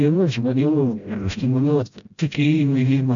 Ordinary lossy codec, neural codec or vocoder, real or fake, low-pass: AAC, 64 kbps; codec, 16 kHz, 0.5 kbps, FreqCodec, smaller model; fake; 7.2 kHz